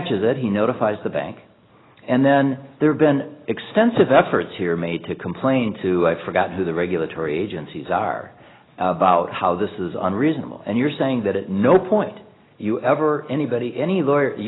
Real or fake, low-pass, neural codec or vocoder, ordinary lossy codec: real; 7.2 kHz; none; AAC, 16 kbps